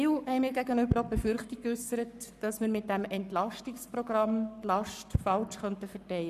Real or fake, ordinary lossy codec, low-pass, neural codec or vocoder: fake; none; 14.4 kHz; codec, 44.1 kHz, 7.8 kbps, Pupu-Codec